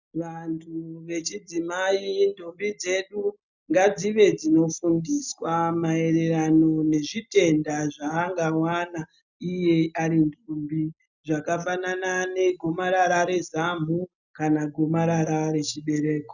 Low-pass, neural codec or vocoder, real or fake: 7.2 kHz; none; real